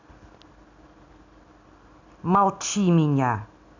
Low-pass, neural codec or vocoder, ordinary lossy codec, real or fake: 7.2 kHz; none; AAC, 48 kbps; real